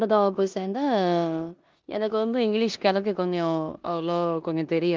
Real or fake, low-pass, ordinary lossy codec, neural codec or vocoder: fake; 7.2 kHz; Opus, 32 kbps; codec, 16 kHz in and 24 kHz out, 0.9 kbps, LongCat-Audio-Codec, four codebook decoder